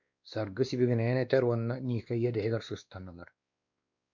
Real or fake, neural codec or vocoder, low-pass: fake; codec, 16 kHz, 2 kbps, X-Codec, WavLM features, trained on Multilingual LibriSpeech; 7.2 kHz